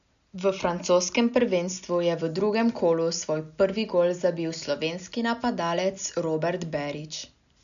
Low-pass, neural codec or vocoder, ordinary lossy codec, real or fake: 7.2 kHz; none; none; real